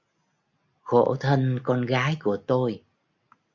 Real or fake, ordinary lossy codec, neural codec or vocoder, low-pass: real; MP3, 64 kbps; none; 7.2 kHz